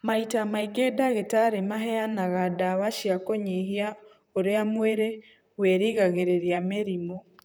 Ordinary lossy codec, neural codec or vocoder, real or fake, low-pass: none; vocoder, 44.1 kHz, 128 mel bands, Pupu-Vocoder; fake; none